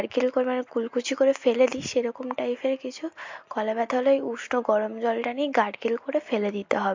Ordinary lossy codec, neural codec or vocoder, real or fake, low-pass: MP3, 48 kbps; none; real; 7.2 kHz